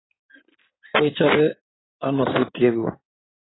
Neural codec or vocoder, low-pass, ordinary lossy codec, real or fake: codec, 16 kHz in and 24 kHz out, 2.2 kbps, FireRedTTS-2 codec; 7.2 kHz; AAC, 16 kbps; fake